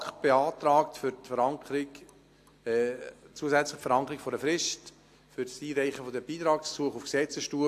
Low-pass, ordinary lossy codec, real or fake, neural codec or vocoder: 14.4 kHz; AAC, 64 kbps; real; none